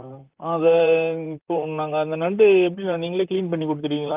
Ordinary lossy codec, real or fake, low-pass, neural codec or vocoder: Opus, 24 kbps; fake; 3.6 kHz; vocoder, 44.1 kHz, 128 mel bands, Pupu-Vocoder